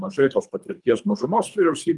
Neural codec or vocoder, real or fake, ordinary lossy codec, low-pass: codec, 24 kHz, 3 kbps, HILCodec; fake; Opus, 32 kbps; 10.8 kHz